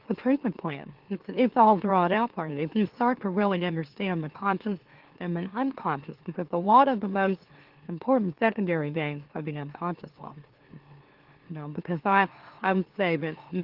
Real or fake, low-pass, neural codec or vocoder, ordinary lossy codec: fake; 5.4 kHz; autoencoder, 44.1 kHz, a latent of 192 numbers a frame, MeloTTS; Opus, 16 kbps